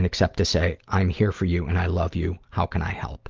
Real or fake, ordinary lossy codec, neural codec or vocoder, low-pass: real; Opus, 32 kbps; none; 7.2 kHz